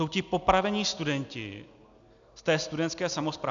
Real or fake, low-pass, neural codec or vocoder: real; 7.2 kHz; none